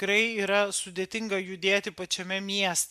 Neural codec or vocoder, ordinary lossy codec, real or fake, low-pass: none; MP3, 96 kbps; real; 14.4 kHz